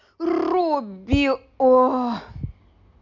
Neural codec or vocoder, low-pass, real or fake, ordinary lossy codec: none; 7.2 kHz; real; none